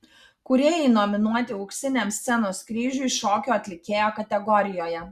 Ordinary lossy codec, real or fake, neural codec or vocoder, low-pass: Opus, 64 kbps; real; none; 14.4 kHz